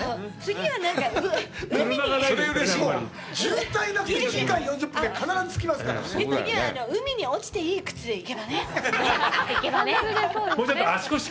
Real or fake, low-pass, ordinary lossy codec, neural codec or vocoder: real; none; none; none